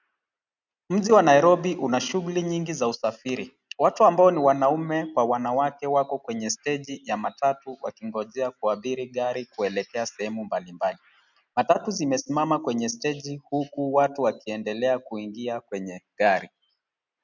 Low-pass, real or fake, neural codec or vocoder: 7.2 kHz; real; none